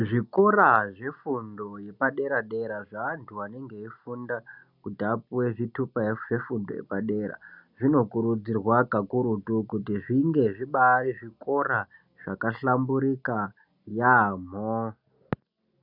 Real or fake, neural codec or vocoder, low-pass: real; none; 5.4 kHz